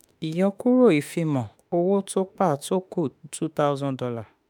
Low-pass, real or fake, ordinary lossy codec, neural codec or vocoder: none; fake; none; autoencoder, 48 kHz, 32 numbers a frame, DAC-VAE, trained on Japanese speech